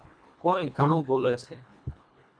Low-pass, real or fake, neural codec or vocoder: 9.9 kHz; fake; codec, 24 kHz, 1.5 kbps, HILCodec